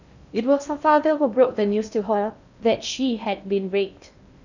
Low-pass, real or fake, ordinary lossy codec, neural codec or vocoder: 7.2 kHz; fake; none; codec, 16 kHz in and 24 kHz out, 0.6 kbps, FocalCodec, streaming, 2048 codes